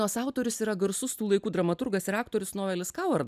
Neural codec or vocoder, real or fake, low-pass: none; real; 14.4 kHz